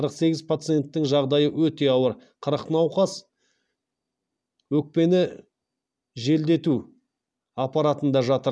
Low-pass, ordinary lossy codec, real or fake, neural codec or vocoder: none; none; real; none